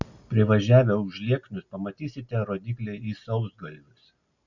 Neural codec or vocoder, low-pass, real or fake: none; 7.2 kHz; real